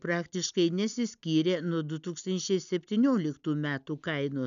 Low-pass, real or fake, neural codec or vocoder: 7.2 kHz; real; none